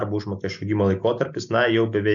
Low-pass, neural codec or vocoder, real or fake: 7.2 kHz; none; real